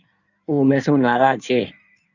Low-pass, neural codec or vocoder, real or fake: 7.2 kHz; codec, 16 kHz in and 24 kHz out, 1.1 kbps, FireRedTTS-2 codec; fake